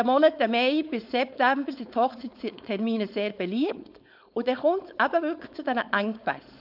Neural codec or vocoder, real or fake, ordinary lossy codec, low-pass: codec, 16 kHz, 4.8 kbps, FACodec; fake; none; 5.4 kHz